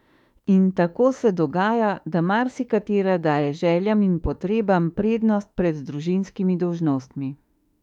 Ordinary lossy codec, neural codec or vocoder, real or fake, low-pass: none; autoencoder, 48 kHz, 32 numbers a frame, DAC-VAE, trained on Japanese speech; fake; 19.8 kHz